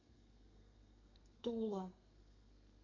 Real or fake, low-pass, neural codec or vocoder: fake; 7.2 kHz; codec, 32 kHz, 1.9 kbps, SNAC